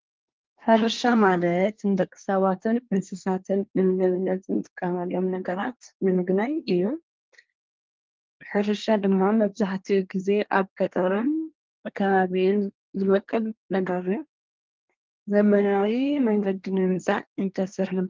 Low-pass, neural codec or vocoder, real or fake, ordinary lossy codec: 7.2 kHz; codec, 24 kHz, 1 kbps, SNAC; fake; Opus, 32 kbps